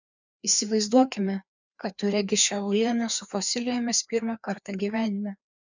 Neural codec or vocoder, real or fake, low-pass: codec, 16 kHz, 2 kbps, FreqCodec, larger model; fake; 7.2 kHz